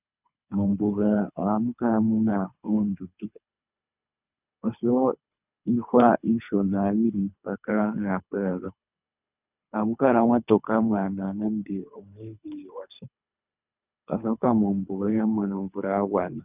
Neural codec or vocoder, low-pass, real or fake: codec, 24 kHz, 3 kbps, HILCodec; 3.6 kHz; fake